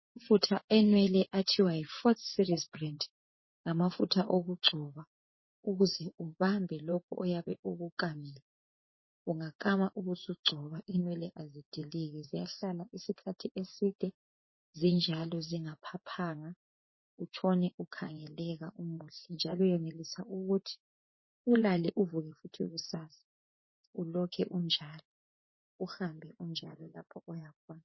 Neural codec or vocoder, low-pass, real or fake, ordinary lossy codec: vocoder, 24 kHz, 100 mel bands, Vocos; 7.2 kHz; fake; MP3, 24 kbps